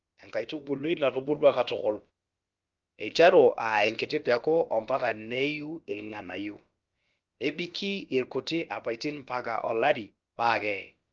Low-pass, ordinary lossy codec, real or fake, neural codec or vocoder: 7.2 kHz; Opus, 24 kbps; fake; codec, 16 kHz, about 1 kbps, DyCAST, with the encoder's durations